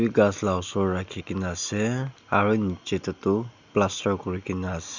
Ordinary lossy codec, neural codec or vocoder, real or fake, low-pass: none; none; real; 7.2 kHz